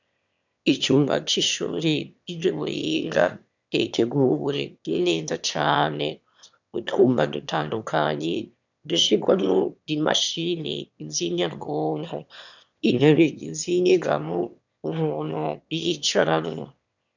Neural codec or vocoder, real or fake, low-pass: autoencoder, 22.05 kHz, a latent of 192 numbers a frame, VITS, trained on one speaker; fake; 7.2 kHz